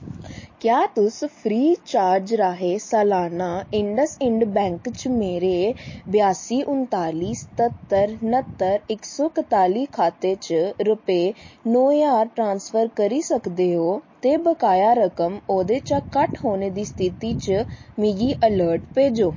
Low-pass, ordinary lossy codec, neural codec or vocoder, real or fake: 7.2 kHz; MP3, 32 kbps; none; real